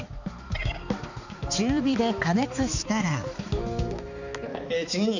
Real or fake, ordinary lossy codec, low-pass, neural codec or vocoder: fake; none; 7.2 kHz; codec, 16 kHz, 4 kbps, X-Codec, HuBERT features, trained on balanced general audio